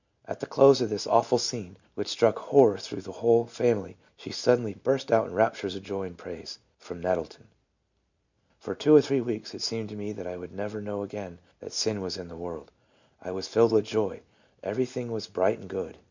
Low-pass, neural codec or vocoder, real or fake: 7.2 kHz; none; real